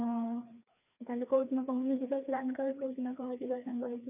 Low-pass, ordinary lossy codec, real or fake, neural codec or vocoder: 3.6 kHz; none; fake; codec, 16 kHz, 2 kbps, FreqCodec, larger model